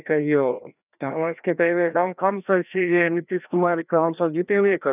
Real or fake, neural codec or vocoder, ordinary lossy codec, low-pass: fake; codec, 16 kHz, 1 kbps, FreqCodec, larger model; none; 3.6 kHz